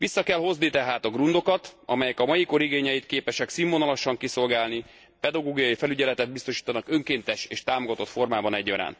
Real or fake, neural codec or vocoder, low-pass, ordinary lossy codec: real; none; none; none